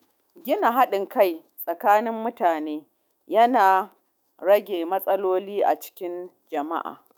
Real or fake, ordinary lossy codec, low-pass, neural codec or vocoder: fake; none; none; autoencoder, 48 kHz, 128 numbers a frame, DAC-VAE, trained on Japanese speech